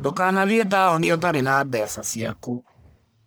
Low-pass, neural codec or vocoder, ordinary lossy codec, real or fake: none; codec, 44.1 kHz, 1.7 kbps, Pupu-Codec; none; fake